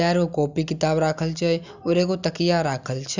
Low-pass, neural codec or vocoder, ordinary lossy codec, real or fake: 7.2 kHz; none; none; real